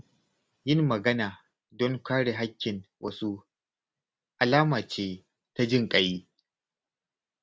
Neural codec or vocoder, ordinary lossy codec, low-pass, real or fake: none; none; none; real